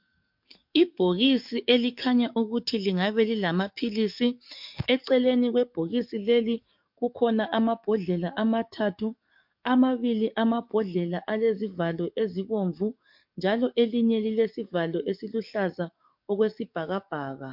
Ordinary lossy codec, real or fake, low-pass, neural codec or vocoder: MP3, 48 kbps; fake; 5.4 kHz; codec, 44.1 kHz, 7.8 kbps, DAC